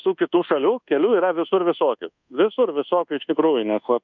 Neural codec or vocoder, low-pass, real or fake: codec, 24 kHz, 1.2 kbps, DualCodec; 7.2 kHz; fake